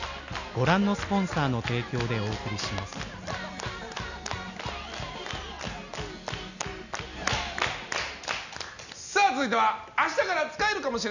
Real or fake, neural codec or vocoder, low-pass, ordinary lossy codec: real; none; 7.2 kHz; none